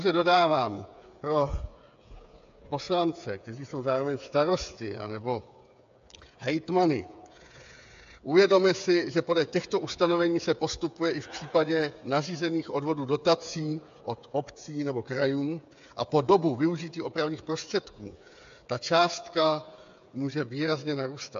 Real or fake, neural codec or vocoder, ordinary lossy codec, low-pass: fake; codec, 16 kHz, 8 kbps, FreqCodec, smaller model; AAC, 64 kbps; 7.2 kHz